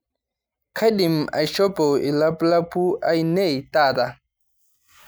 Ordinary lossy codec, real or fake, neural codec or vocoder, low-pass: none; real; none; none